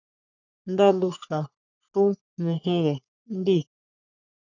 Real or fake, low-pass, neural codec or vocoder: fake; 7.2 kHz; codec, 44.1 kHz, 3.4 kbps, Pupu-Codec